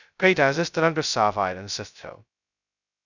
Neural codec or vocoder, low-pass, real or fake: codec, 16 kHz, 0.2 kbps, FocalCodec; 7.2 kHz; fake